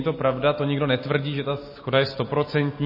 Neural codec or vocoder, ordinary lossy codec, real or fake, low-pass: none; MP3, 24 kbps; real; 5.4 kHz